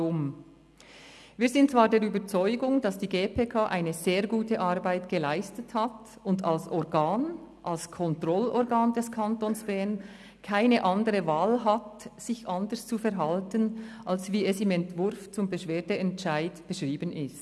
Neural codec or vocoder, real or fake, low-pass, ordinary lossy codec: none; real; none; none